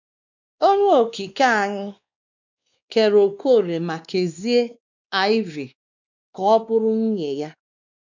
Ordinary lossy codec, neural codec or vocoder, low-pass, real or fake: none; codec, 16 kHz, 2 kbps, X-Codec, WavLM features, trained on Multilingual LibriSpeech; 7.2 kHz; fake